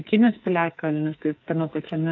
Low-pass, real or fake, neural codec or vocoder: 7.2 kHz; fake; codec, 44.1 kHz, 2.6 kbps, SNAC